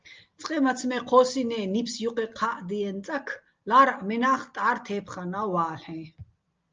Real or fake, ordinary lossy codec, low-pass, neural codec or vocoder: real; Opus, 24 kbps; 7.2 kHz; none